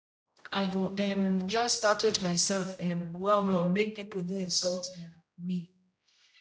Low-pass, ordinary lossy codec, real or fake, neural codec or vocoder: none; none; fake; codec, 16 kHz, 0.5 kbps, X-Codec, HuBERT features, trained on general audio